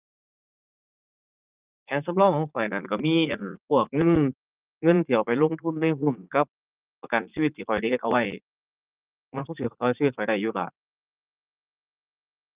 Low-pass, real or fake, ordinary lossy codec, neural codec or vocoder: 3.6 kHz; fake; Opus, 32 kbps; vocoder, 44.1 kHz, 80 mel bands, Vocos